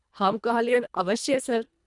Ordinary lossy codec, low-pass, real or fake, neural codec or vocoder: none; 10.8 kHz; fake; codec, 24 kHz, 1.5 kbps, HILCodec